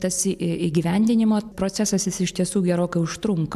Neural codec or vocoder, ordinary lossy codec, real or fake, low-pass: none; MP3, 96 kbps; real; 14.4 kHz